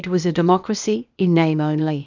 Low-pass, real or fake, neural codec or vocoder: 7.2 kHz; fake; codec, 16 kHz, 0.8 kbps, ZipCodec